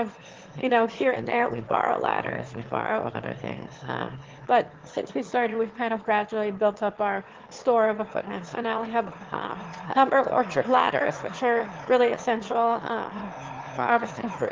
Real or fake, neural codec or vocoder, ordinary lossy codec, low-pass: fake; autoencoder, 22.05 kHz, a latent of 192 numbers a frame, VITS, trained on one speaker; Opus, 16 kbps; 7.2 kHz